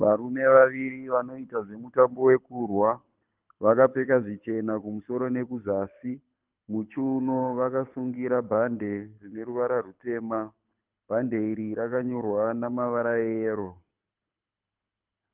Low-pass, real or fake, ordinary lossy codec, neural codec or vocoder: 3.6 kHz; fake; Opus, 24 kbps; codec, 24 kHz, 6 kbps, HILCodec